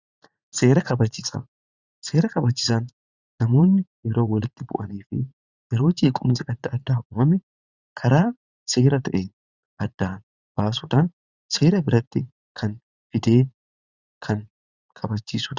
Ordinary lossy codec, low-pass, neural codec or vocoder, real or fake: Opus, 64 kbps; 7.2 kHz; vocoder, 44.1 kHz, 80 mel bands, Vocos; fake